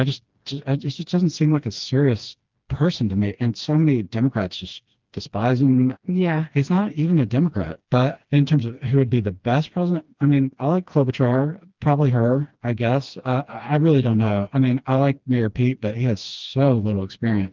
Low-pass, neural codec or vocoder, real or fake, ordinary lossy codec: 7.2 kHz; codec, 16 kHz, 2 kbps, FreqCodec, smaller model; fake; Opus, 24 kbps